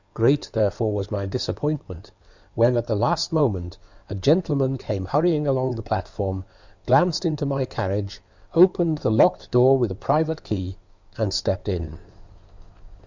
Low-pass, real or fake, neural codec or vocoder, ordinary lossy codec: 7.2 kHz; fake; codec, 16 kHz in and 24 kHz out, 2.2 kbps, FireRedTTS-2 codec; Opus, 64 kbps